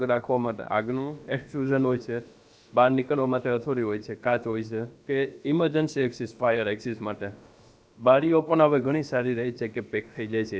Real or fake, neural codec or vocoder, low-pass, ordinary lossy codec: fake; codec, 16 kHz, about 1 kbps, DyCAST, with the encoder's durations; none; none